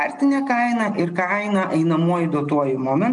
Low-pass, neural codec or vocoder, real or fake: 9.9 kHz; none; real